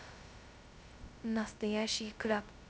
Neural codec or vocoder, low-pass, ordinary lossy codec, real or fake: codec, 16 kHz, 0.2 kbps, FocalCodec; none; none; fake